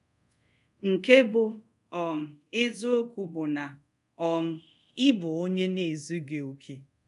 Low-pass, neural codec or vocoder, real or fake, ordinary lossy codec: 10.8 kHz; codec, 24 kHz, 0.5 kbps, DualCodec; fake; none